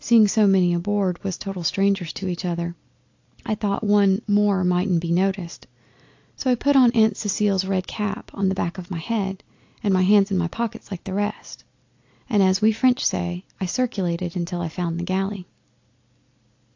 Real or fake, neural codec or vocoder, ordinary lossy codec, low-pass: real; none; AAC, 48 kbps; 7.2 kHz